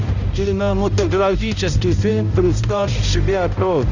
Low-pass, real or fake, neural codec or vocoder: 7.2 kHz; fake; codec, 16 kHz, 0.5 kbps, X-Codec, HuBERT features, trained on balanced general audio